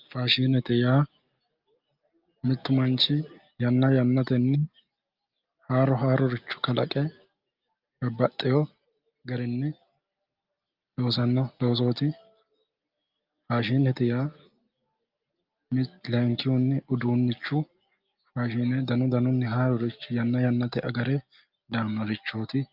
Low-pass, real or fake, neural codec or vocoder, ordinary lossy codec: 5.4 kHz; real; none; Opus, 32 kbps